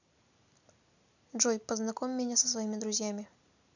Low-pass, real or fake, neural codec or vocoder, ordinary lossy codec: 7.2 kHz; real; none; none